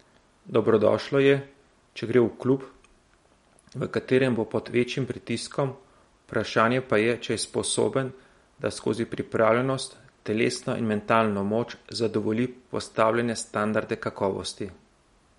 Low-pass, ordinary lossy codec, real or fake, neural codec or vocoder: 19.8 kHz; MP3, 48 kbps; real; none